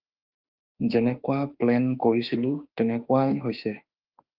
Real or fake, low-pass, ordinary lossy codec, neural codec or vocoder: fake; 5.4 kHz; Opus, 32 kbps; autoencoder, 48 kHz, 32 numbers a frame, DAC-VAE, trained on Japanese speech